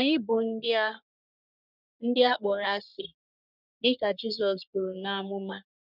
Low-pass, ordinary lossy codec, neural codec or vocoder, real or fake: 5.4 kHz; none; codec, 44.1 kHz, 3.4 kbps, Pupu-Codec; fake